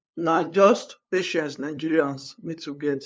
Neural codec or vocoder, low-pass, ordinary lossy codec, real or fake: codec, 16 kHz, 2 kbps, FunCodec, trained on LibriTTS, 25 frames a second; none; none; fake